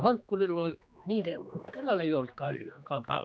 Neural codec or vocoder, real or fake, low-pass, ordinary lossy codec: codec, 16 kHz, 2 kbps, X-Codec, HuBERT features, trained on general audio; fake; none; none